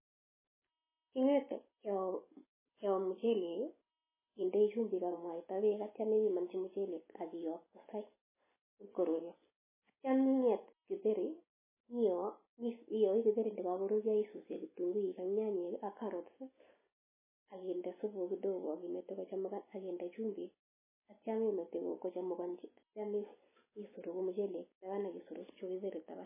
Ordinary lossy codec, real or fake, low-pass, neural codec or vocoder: MP3, 16 kbps; real; 3.6 kHz; none